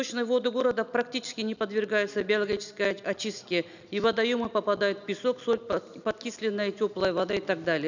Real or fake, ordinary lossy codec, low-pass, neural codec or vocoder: real; none; 7.2 kHz; none